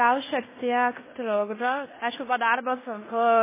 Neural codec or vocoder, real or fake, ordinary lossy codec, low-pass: codec, 16 kHz in and 24 kHz out, 0.9 kbps, LongCat-Audio-Codec, fine tuned four codebook decoder; fake; MP3, 16 kbps; 3.6 kHz